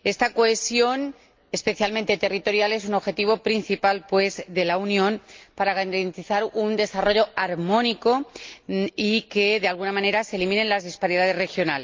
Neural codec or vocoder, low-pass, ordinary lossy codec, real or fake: none; 7.2 kHz; Opus, 32 kbps; real